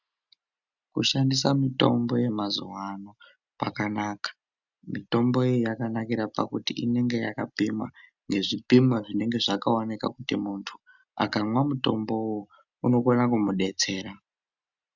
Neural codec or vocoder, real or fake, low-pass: none; real; 7.2 kHz